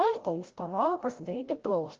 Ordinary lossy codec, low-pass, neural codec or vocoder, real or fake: Opus, 16 kbps; 7.2 kHz; codec, 16 kHz, 0.5 kbps, FreqCodec, larger model; fake